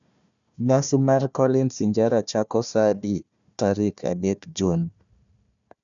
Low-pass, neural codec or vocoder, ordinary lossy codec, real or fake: 7.2 kHz; codec, 16 kHz, 1 kbps, FunCodec, trained on Chinese and English, 50 frames a second; none; fake